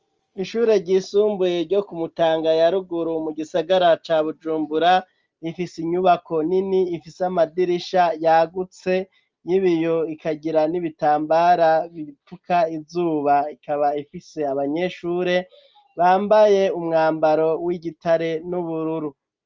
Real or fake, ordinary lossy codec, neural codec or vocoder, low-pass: real; Opus, 24 kbps; none; 7.2 kHz